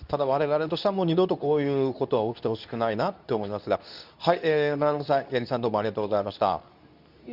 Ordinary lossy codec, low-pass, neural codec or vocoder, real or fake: none; 5.4 kHz; codec, 24 kHz, 0.9 kbps, WavTokenizer, medium speech release version 2; fake